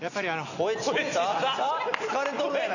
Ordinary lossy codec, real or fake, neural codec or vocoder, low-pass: none; real; none; 7.2 kHz